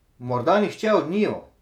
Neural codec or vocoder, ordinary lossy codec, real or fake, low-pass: vocoder, 48 kHz, 128 mel bands, Vocos; none; fake; 19.8 kHz